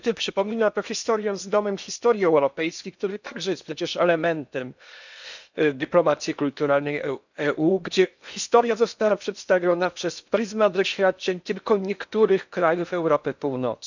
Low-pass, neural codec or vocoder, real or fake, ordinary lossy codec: 7.2 kHz; codec, 16 kHz in and 24 kHz out, 0.8 kbps, FocalCodec, streaming, 65536 codes; fake; none